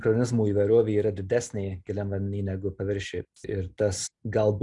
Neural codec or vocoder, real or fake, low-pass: none; real; 10.8 kHz